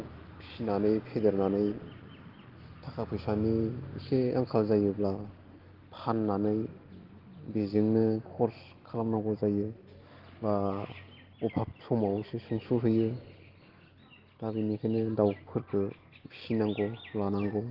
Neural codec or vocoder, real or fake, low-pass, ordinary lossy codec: none; real; 5.4 kHz; Opus, 16 kbps